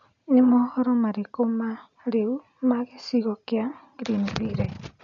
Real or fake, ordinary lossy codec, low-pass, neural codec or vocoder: real; none; 7.2 kHz; none